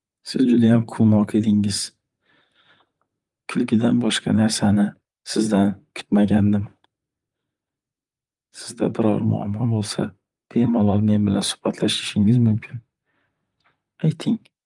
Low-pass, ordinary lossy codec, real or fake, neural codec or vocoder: 10.8 kHz; Opus, 32 kbps; fake; vocoder, 44.1 kHz, 128 mel bands, Pupu-Vocoder